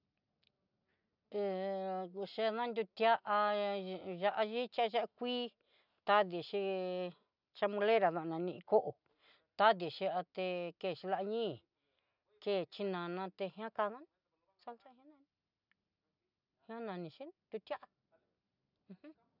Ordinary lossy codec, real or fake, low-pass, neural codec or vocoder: none; real; 5.4 kHz; none